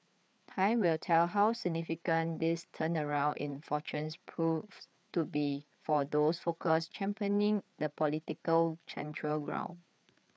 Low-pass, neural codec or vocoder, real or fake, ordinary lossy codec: none; codec, 16 kHz, 4 kbps, FreqCodec, larger model; fake; none